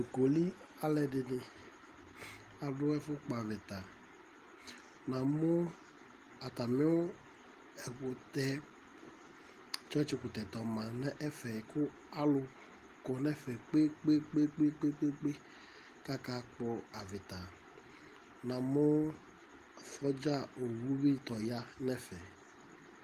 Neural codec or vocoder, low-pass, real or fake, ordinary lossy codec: none; 14.4 kHz; real; Opus, 24 kbps